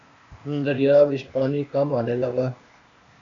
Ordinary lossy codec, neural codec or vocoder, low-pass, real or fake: AAC, 64 kbps; codec, 16 kHz, 0.8 kbps, ZipCodec; 7.2 kHz; fake